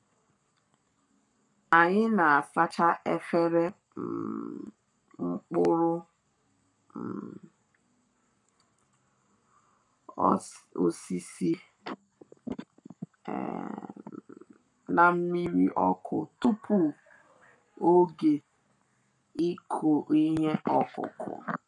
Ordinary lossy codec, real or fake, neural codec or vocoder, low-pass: none; fake; codec, 44.1 kHz, 7.8 kbps, Pupu-Codec; 10.8 kHz